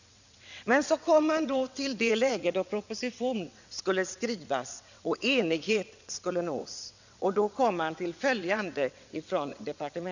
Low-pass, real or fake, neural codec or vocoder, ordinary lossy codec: 7.2 kHz; fake; vocoder, 22.05 kHz, 80 mel bands, WaveNeXt; none